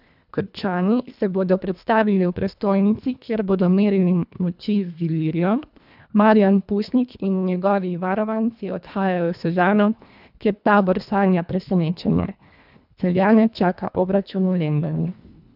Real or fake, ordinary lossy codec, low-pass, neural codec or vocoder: fake; none; 5.4 kHz; codec, 24 kHz, 1.5 kbps, HILCodec